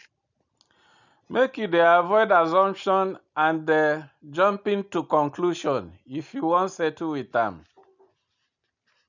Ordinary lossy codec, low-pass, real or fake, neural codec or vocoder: none; 7.2 kHz; real; none